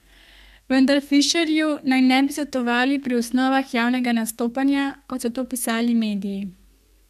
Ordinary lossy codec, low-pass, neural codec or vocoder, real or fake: none; 14.4 kHz; codec, 32 kHz, 1.9 kbps, SNAC; fake